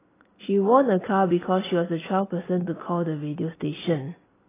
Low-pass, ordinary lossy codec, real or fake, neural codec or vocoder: 3.6 kHz; AAC, 16 kbps; real; none